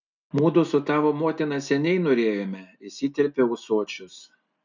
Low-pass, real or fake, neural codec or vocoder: 7.2 kHz; real; none